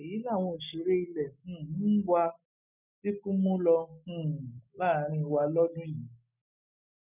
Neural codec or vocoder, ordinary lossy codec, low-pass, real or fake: none; none; 3.6 kHz; real